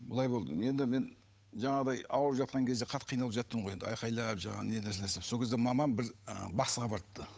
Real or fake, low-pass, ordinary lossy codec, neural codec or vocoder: fake; none; none; codec, 16 kHz, 8 kbps, FunCodec, trained on Chinese and English, 25 frames a second